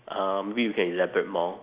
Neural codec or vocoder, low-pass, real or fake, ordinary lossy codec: none; 3.6 kHz; real; Opus, 64 kbps